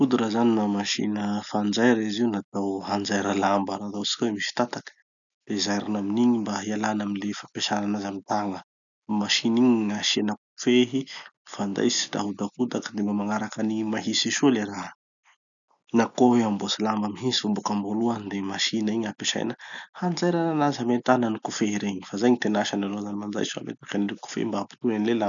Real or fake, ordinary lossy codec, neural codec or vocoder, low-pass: real; none; none; 7.2 kHz